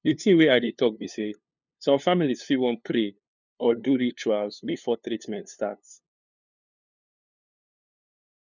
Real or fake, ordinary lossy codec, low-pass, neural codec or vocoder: fake; none; 7.2 kHz; codec, 16 kHz, 2 kbps, FunCodec, trained on LibriTTS, 25 frames a second